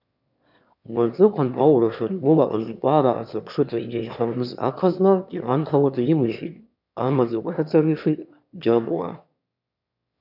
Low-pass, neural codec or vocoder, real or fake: 5.4 kHz; autoencoder, 22.05 kHz, a latent of 192 numbers a frame, VITS, trained on one speaker; fake